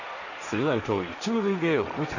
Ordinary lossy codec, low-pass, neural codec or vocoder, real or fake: none; 7.2 kHz; codec, 16 kHz, 1.1 kbps, Voila-Tokenizer; fake